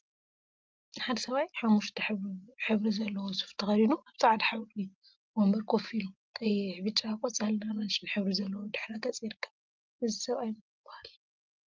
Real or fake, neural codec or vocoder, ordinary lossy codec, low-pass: real; none; Opus, 24 kbps; 7.2 kHz